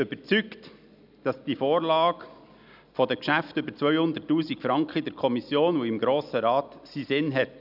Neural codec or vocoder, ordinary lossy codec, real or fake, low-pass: none; none; real; 5.4 kHz